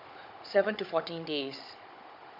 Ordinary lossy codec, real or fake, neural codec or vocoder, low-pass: MP3, 48 kbps; fake; codec, 16 kHz, 16 kbps, FunCodec, trained on Chinese and English, 50 frames a second; 5.4 kHz